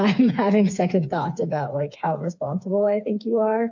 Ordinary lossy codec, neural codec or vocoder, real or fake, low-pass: MP3, 48 kbps; codec, 16 kHz, 4 kbps, FreqCodec, smaller model; fake; 7.2 kHz